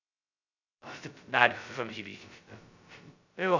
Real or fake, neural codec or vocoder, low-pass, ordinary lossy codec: fake; codec, 16 kHz, 0.2 kbps, FocalCodec; 7.2 kHz; none